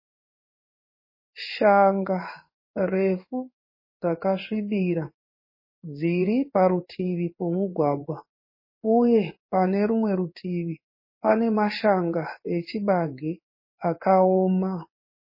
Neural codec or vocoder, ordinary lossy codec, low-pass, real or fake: codec, 16 kHz in and 24 kHz out, 1 kbps, XY-Tokenizer; MP3, 24 kbps; 5.4 kHz; fake